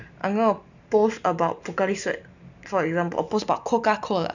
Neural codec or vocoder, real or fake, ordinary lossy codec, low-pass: none; real; none; 7.2 kHz